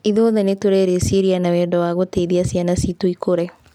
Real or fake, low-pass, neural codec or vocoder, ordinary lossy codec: real; 19.8 kHz; none; none